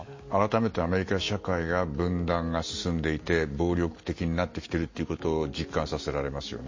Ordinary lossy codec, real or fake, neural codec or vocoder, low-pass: MP3, 32 kbps; real; none; 7.2 kHz